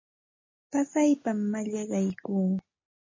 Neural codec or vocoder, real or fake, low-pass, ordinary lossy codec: none; real; 7.2 kHz; MP3, 32 kbps